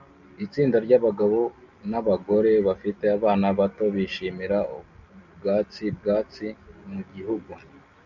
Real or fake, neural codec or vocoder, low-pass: real; none; 7.2 kHz